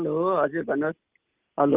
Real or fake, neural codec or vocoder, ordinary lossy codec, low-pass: real; none; Opus, 32 kbps; 3.6 kHz